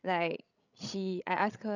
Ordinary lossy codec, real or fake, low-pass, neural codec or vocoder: none; fake; 7.2 kHz; codec, 16 kHz, 16 kbps, FreqCodec, larger model